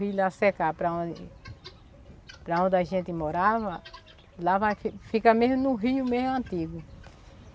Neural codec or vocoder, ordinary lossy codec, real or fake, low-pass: none; none; real; none